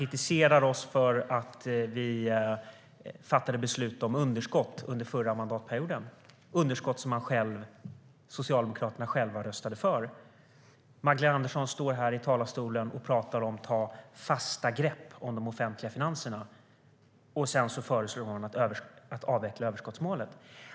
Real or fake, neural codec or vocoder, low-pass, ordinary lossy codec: real; none; none; none